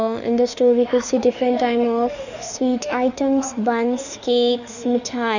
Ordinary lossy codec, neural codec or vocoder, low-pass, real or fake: none; autoencoder, 48 kHz, 32 numbers a frame, DAC-VAE, trained on Japanese speech; 7.2 kHz; fake